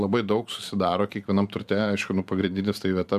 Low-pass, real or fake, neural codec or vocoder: 14.4 kHz; fake; vocoder, 44.1 kHz, 128 mel bands every 512 samples, BigVGAN v2